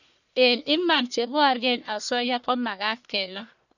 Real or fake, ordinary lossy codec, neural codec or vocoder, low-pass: fake; none; codec, 44.1 kHz, 1.7 kbps, Pupu-Codec; 7.2 kHz